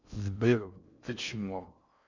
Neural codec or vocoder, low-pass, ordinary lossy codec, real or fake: codec, 16 kHz in and 24 kHz out, 0.6 kbps, FocalCodec, streaming, 2048 codes; 7.2 kHz; none; fake